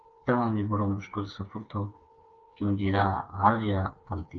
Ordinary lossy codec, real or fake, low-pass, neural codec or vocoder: Opus, 24 kbps; fake; 7.2 kHz; codec, 16 kHz, 4 kbps, FreqCodec, smaller model